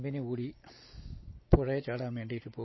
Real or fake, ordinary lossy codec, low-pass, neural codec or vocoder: real; MP3, 24 kbps; 7.2 kHz; none